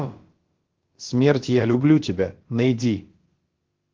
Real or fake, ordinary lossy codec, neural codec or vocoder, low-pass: fake; Opus, 16 kbps; codec, 16 kHz, about 1 kbps, DyCAST, with the encoder's durations; 7.2 kHz